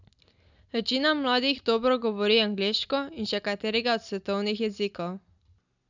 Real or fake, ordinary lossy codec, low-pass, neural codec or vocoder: real; none; 7.2 kHz; none